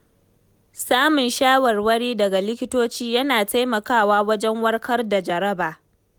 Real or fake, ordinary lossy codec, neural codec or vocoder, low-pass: real; none; none; none